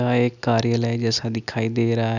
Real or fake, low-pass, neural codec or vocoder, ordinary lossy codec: real; 7.2 kHz; none; none